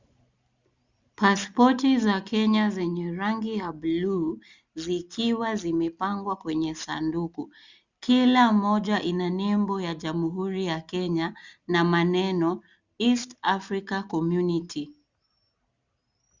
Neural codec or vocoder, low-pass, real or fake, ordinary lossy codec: none; 7.2 kHz; real; Opus, 64 kbps